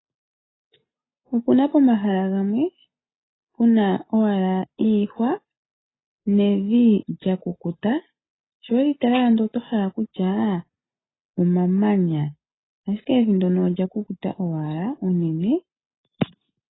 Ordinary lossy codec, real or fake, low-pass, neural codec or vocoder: AAC, 16 kbps; real; 7.2 kHz; none